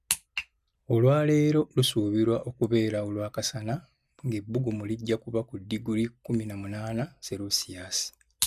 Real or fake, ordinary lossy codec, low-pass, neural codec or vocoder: real; none; 14.4 kHz; none